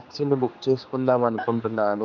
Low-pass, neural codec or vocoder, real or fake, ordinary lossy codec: 7.2 kHz; codec, 16 kHz, 2 kbps, X-Codec, HuBERT features, trained on general audio; fake; none